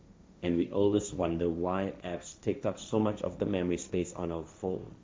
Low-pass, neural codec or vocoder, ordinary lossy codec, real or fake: none; codec, 16 kHz, 1.1 kbps, Voila-Tokenizer; none; fake